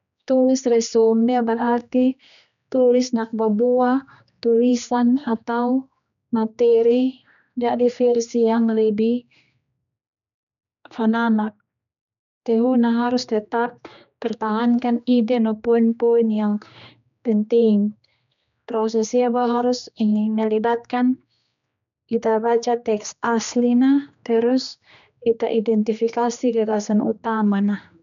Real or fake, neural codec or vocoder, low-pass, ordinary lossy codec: fake; codec, 16 kHz, 2 kbps, X-Codec, HuBERT features, trained on general audio; 7.2 kHz; none